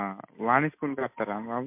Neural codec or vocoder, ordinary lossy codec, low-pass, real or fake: none; MP3, 32 kbps; 3.6 kHz; real